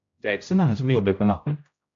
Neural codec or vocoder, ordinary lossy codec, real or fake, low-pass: codec, 16 kHz, 0.5 kbps, X-Codec, HuBERT features, trained on general audio; AAC, 64 kbps; fake; 7.2 kHz